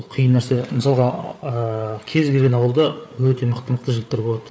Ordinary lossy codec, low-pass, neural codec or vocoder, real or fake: none; none; codec, 16 kHz, 8 kbps, FreqCodec, larger model; fake